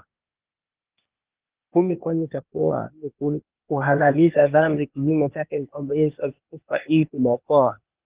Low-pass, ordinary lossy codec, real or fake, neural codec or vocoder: 3.6 kHz; Opus, 32 kbps; fake; codec, 16 kHz, 0.8 kbps, ZipCodec